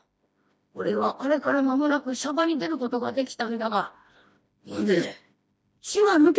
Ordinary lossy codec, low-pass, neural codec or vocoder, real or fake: none; none; codec, 16 kHz, 1 kbps, FreqCodec, smaller model; fake